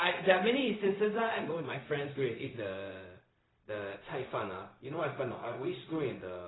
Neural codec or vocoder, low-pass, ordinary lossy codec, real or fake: codec, 16 kHz, 0.4 kbps, LongCat-Audio-Codec; 7.2 kHz; AAC, 16 kbps; fake